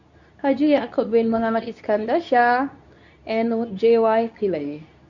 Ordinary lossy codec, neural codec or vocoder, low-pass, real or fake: none; codec, 24 kHz, 0.9 kbps, WavTokenizer, medium speech release version 2; 7.2 kHz; fake